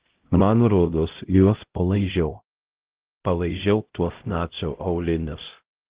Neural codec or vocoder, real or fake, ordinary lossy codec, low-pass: codec, 16 kHz, 0.5 kbps, X-Codec, HuBERT features, trained on LibriSpeech; fake; Opus, 16 kbps; 3.6 kHz